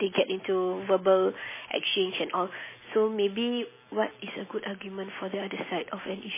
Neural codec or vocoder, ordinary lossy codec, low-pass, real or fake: none; MP3, 16 kbps; 3.6 kHz; real